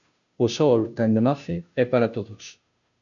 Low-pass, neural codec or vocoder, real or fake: 7.2 kHz; codec, 16 kHz, 0.5 kbps, FunCodec, trained on Chinese and English, 25 frames a second; fake